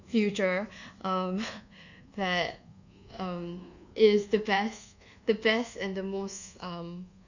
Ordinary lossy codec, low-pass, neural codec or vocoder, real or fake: none; 7.2 kHz; codec, 24 kHz, 1.2 kbps, DualCodec; fake